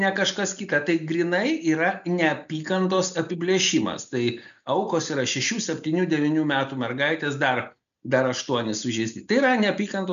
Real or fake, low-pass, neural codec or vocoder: real; 7.2 kHz; none